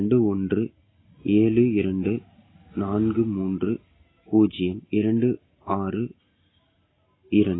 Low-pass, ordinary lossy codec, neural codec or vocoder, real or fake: 7.2 kHz; AAC, 16 kbps; none; real